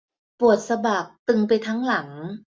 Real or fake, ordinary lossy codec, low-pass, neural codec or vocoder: real; none; none; none